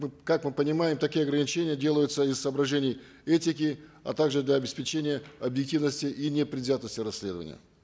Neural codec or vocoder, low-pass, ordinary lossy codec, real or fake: none; none; none; real